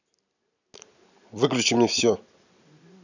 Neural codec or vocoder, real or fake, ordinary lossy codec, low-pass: none; real; none; 7.2 kHz